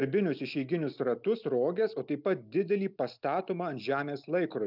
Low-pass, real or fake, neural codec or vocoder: 5.4 kHz; real; none